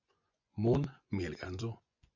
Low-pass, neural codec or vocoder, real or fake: 7.2 kHz; vocoder, 24 kHz, 100 mel bands, Vocos; fake